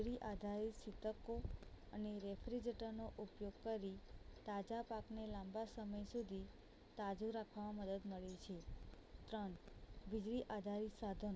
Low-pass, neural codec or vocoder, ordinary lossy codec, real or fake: none; none; none; real